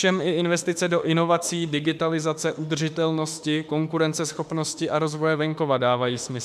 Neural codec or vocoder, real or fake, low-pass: autoencoder, 48 kHz, 32 numbers a frame, DAC-VAE, trained on Japanese speech; fake; 14.4 kHz